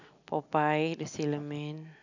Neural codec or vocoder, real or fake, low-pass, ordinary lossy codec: none; real; 7.2 kHz; none